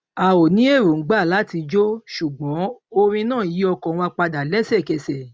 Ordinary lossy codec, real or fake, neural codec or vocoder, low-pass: none; real; none; none